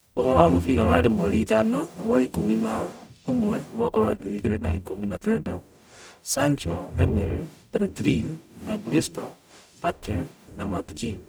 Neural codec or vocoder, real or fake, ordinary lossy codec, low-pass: codec, 44.1 kHz, 0.9 kbps, DAC; fake; none; none